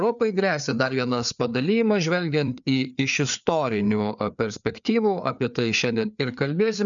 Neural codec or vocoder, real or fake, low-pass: codec, 16 kHz, 4 kbps, FreqCodec, larger model; fake; 7.2 kHz